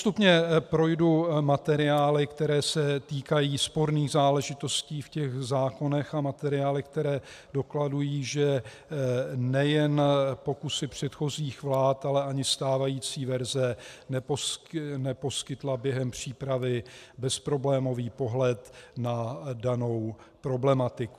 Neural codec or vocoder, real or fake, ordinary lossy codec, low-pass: none; real; AAC, 96 kbps; 14.4 kHz